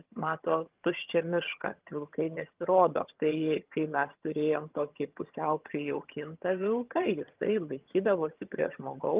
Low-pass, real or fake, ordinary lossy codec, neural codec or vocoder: 3.6 kHz; fake; Opus, 24 kbps; vocoder, 22.05 kHz, 80 mel bands, HiFi-GAN